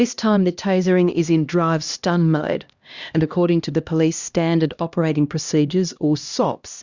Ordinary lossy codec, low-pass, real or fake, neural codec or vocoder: Opus, 64 kbps; 7.2 kHz; fake; codec, 16 kHz, 1 kbps, X-Codec, HuBERT features, trained on LibriSpeech